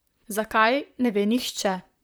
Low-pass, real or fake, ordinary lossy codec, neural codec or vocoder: none; fake; none; vocoder, 44.1 kHz, 128 mel bands, Pupu-Vocoder